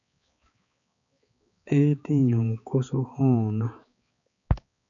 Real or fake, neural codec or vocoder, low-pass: fake; codec, 16 kHz, 4 kbps, X-Codec, HuBERT features, trained on balanced general audio; 7.2 kHz